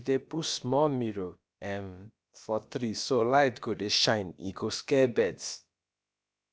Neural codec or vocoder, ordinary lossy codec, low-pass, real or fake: codec, 16 kHz, about 1 kbps, DyCAST, with the encoder's durations; none; none; fake